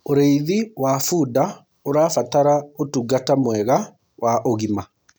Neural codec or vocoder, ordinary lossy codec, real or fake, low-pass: none; none; real; none